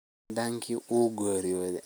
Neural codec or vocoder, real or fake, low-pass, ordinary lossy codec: none; real; none; none